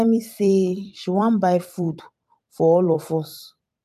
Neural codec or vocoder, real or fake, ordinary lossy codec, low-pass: vocoder, 44.1 kHz, 128 mel bands every 256 samples, BigVGAN v2; fake; AAC, 96 kbps; 14.4 kHz